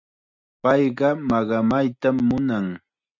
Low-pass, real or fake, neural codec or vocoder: 7.2 kHz; real; none